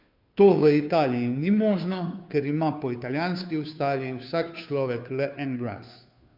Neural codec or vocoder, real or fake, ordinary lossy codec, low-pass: codec, 16 kHz, 2 kbps, FunCodec, trained on Chinese and English, 25 frames a second; fake; MP3, 48 kbps; 5.4 kHz